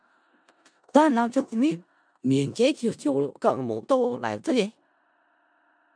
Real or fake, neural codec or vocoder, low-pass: fake; codec, 16 kHz in and 24 kHz out, 0.4 kbps, LongCat-Audio-Codec, four codebook decoder; 9.9 kHz